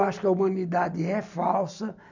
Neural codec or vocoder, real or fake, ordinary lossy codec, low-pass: none; real; none; 7.2 kHz